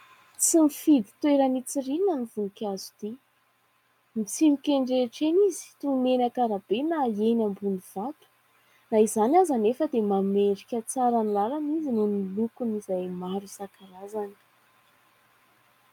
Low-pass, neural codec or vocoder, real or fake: 19.8 kHz; none; real